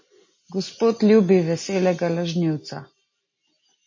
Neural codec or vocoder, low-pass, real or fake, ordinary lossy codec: none; 7.2 kHz; real; MP3, 32 kbps